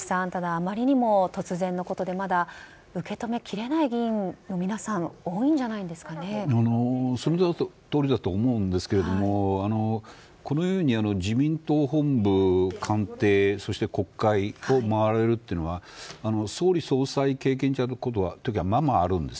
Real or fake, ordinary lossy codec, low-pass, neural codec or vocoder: real; none; none; none